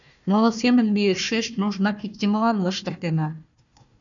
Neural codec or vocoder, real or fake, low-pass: codec, 16 kHz, 1 kbps, FunCodec, trained on Chinese and English, 50 frames a second; fake; 7.2 kHz